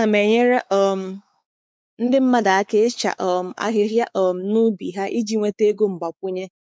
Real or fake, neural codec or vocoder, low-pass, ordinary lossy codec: fake; codec, 16 kHz, 4 kbps, X-Codec, WavLM features, trained on Multilingual LibriSpeech; none; none